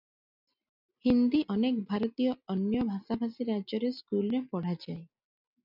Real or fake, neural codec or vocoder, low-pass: real; none; 5.4 kHz